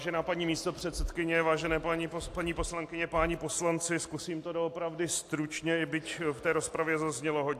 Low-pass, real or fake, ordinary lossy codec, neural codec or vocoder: 14.4 kHz; real; AAC, 64 kbps; none